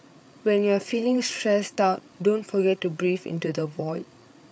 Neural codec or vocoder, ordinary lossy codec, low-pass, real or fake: codec, 16 kHz, 8 kbps, FreqCodec, larger model; none; none; fake